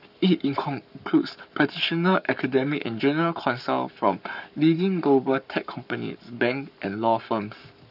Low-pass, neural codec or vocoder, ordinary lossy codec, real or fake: 5.4 kHz; codec, 44.1 kHz, 7.8 kbps, Pupu-Codec; none; fake